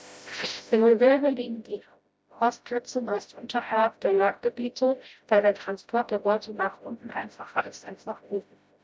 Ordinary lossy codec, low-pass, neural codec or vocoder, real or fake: none; none; codec, 16 kHz, 0.5 kbps, FreqCodec, smaller model; fake